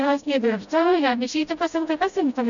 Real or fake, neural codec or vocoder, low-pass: fake; codec, 16 kHz, 0.5 kbps, FreqCodec, smaller model; 7.2 kHz